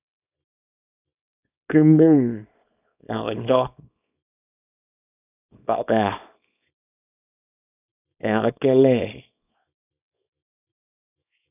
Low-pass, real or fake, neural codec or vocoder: 3.6 kHz; fake; codec, 24 kHz, 0.9 kbps, WavTokenizer, small release